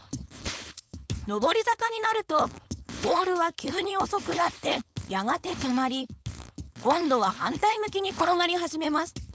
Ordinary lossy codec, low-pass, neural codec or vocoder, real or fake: none; none; codec, 16 kHz, 4.8 kbps, FACodec; fake